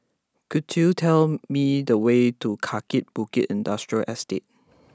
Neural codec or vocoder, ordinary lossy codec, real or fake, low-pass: none; none; real; none